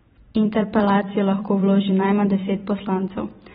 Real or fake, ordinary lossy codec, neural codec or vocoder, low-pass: fake; AAC, 16 kbps; vocoder, 44.1 kHz, 128 mel bands every 512 samples, BigVGAN v2; 19.8 kHz